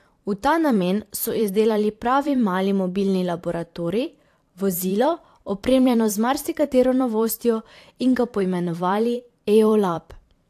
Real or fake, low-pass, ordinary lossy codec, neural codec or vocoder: fake; 14.4 kHz; AAC, 64 kbps; vocoder, 44.1 kHz, 128 mel bands every 512 samples, BigVGAN v2